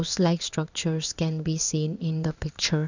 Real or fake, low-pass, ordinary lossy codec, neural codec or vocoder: fake; 7.2 kHz; none; codec, 16 kHz in and 24 kHz out, 1 kbps, XY-Tokenizer